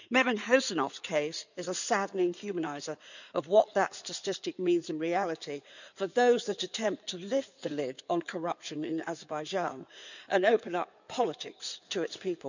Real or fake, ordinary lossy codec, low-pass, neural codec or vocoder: fake; none; 7.2 kHz; codec, 16 kHz in and 24 kHz out, 2.2 kbps, FireRedTTS-2 codec